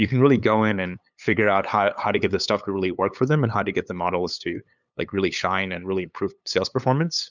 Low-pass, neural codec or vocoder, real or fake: 7.2 kHz; codec, 16 kHz, 8 kbps, FunCodec, trained on LibriTTS, 25 frames a second; fake